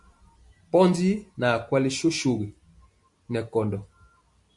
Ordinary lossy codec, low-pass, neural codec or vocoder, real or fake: MP3, 64 kbps; 10.8 kHz; none; real